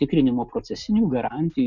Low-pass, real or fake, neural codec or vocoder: 7.2 kHz; real; none